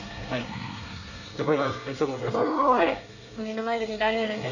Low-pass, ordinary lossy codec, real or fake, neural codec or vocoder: 7.2 kHz; none; fake; codec, 24 kHz, 1 kbps, SNAC